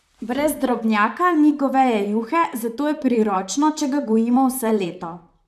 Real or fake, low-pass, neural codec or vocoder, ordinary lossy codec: fake; 14.4 kHz; vocoder, 44.1 kHz, 128 mel bands, Pupu-Vocoder; none